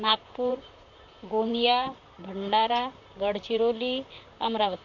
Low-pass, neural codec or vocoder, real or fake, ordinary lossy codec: 7.2 kHz; vocoder, 44.1 kHz, 128 mel bands, Pupu-Vocoder; fake; none